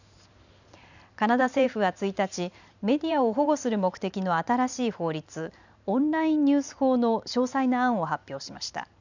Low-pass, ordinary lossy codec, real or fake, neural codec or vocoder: 7.2 kHz; none; fake; vocoder, 44.1 kHz, 128 mel bands every 512 samples, BigVGAN v2